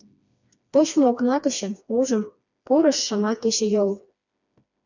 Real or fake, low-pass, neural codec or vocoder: fake; 7.2 kHz; codec, 16 kHz, 2 kbps, FreqCodec, smaller model